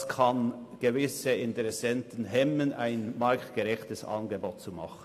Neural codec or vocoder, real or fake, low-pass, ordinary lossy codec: none; real; 14.4 kHz; AAC, 64 kbps